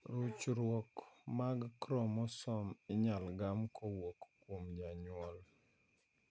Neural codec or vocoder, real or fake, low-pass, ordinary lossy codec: none; real; none; none